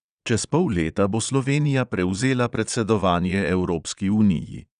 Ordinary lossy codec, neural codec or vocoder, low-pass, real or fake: none; vocoder, 22.05 kHz, 80 mel bands, Vocos; 9.9 kHz; fake